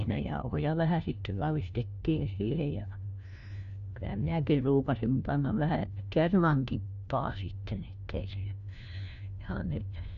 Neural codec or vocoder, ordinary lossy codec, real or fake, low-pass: codec, 16 kHz, 1 kbps, FunCodec, trained on LibriTTS, 50 frames a second; none; fake; 7.2 kHz